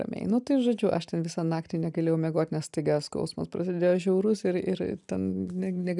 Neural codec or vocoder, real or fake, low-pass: none; real; 10.8 kHz